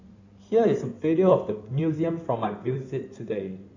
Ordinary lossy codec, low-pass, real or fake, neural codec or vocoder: none; 7.2 kHz; fake; codec, 16 kHz in and 24 kHz out, 2.2 kbps, FireRedTTS-2 codec